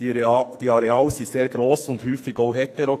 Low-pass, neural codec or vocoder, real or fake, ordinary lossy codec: 14.4 kHz; codec, 44.1 kHz, 2.6 kbps, SNAC; fake; AAC, 64 kbps